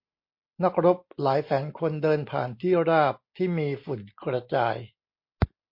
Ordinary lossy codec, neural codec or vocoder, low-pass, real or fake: MP3, 32 kbps; none; 5.4 kHz; real